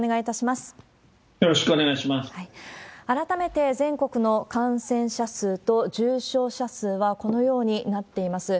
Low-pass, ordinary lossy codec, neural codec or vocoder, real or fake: none; none; none; real